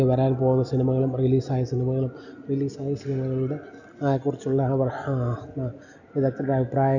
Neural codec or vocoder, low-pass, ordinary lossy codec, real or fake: none; 7.2 kHz; none; real